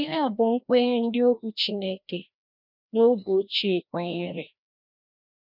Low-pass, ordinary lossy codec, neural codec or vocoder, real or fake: 5.4 kHz; none; codec, 16 kHz, 1 kbps, FreqCodec, larger model; fake